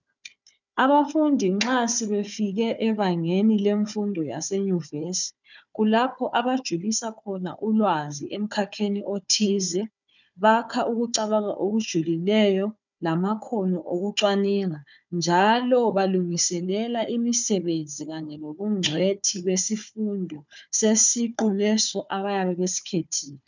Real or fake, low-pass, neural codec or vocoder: fake; 7.2 kHz; codec, 16 kHz, 4 kbps, FunCodec, trained on Chinese and English, 50 frames a second